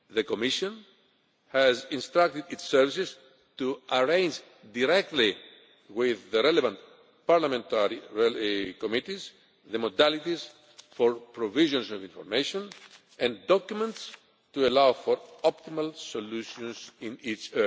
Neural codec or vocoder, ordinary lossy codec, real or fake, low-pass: none; none; real; none